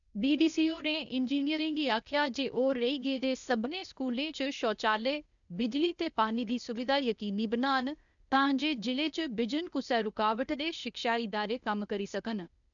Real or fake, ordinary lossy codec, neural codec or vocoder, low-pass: fake; none; codec, 16 kHz, 0.8 kbps, ZipCodec; 7.2 kHz